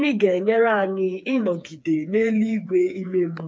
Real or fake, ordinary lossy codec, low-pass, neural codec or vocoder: fake; none; none; codec, 16 kHz, 4 kbps, FreqCodec, smaller model